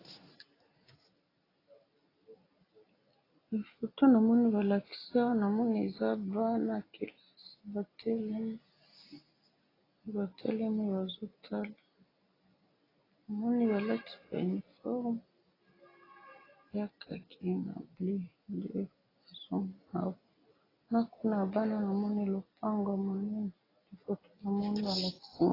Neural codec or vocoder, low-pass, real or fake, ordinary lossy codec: none; 5.4 kHz; real; AAC, 24 kbps